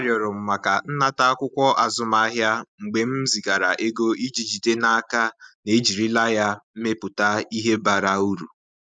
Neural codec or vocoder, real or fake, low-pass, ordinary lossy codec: none; real; 9.9 kHz; none